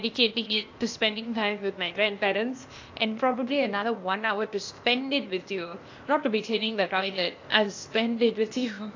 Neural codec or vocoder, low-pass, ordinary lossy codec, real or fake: codec, 16 kHz, 0.8 kbps, ZipCodec; 7.2 kHz; AAC, 48 kbps; fake